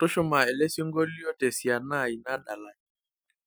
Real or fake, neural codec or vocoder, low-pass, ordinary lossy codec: real; none; none; none